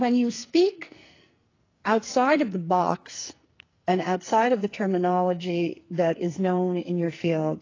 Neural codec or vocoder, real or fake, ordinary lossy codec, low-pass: codec, 44.1 kHz, 2.6 kbps, SNAC; fake; AAC, 32 kbps; 7.2 kHz